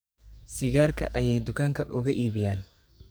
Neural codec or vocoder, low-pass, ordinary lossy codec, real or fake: codec, 44.1 kHz, 2.6 kbps, SNAC; none; none; fake